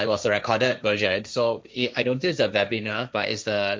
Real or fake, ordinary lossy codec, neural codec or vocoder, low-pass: fake; none; codec, 16 kHz, 1.1 kbps, Voila-Tokenizer; none